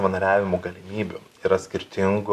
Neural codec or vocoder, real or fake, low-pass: none; real; 14.4 kHz